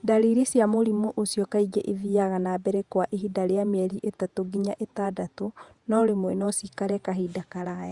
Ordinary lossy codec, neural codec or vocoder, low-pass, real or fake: Opus, 64 kbps; vocoder, 48 kHz, 128 mel bands, Vocos; 10.8 kHz; fake